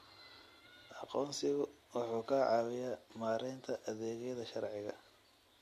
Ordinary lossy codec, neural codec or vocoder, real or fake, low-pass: MP3, 64 kbps; none; real; 14.4 kHz